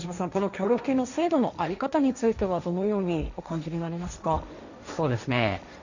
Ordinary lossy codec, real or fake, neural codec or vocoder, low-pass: none; fake; codec, 16 kHz, 1.1 kbps, Voila-Tokenizer; 7.2 kHz